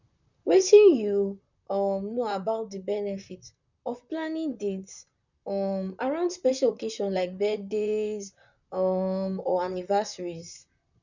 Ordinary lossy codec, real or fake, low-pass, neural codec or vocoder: none; fake; 7.2 kHz; vocoder, 44.1 kHz, 128 mel bands, Pupu-Vocoder